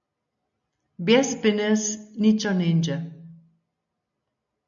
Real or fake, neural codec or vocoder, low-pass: real; none; 7.2 kHz